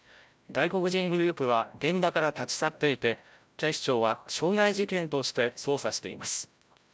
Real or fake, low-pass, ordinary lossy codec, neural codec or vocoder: fake; none; none; codec, 16 kHz, 0.5 kbps, FreqCodec, larger model